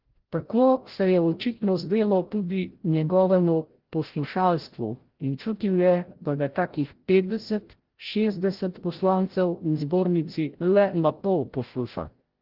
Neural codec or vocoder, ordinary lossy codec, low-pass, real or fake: codec, 16 kHz, 0.5 kbps, FreqCodec, larger model; Opus, 16 kbps; 5.4 kHz; fake